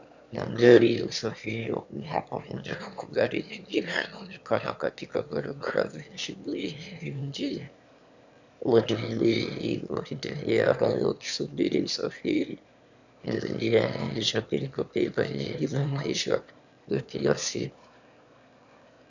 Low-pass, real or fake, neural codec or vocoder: 7.2 kHz; fake; autoencoder, 22.05 kHz, a latent of 192 numbers a frame, VITS, trained on one speaker